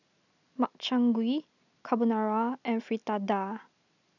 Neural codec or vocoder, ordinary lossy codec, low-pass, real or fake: none; none; 7.2 kHz; real